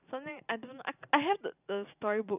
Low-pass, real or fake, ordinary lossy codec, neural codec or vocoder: 3.6 kHz; fake; none; vocoder, 44.1 kHz, 128 mel bands every 512 samples, BigVGAN v2